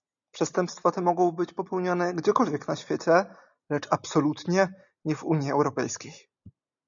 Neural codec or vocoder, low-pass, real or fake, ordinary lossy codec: none; 7.2 kHz; real; MP3, 96 kbps